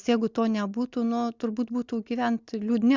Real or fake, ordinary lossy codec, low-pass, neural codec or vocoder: real; Opus, 64 kbps; 7.2 kHz; none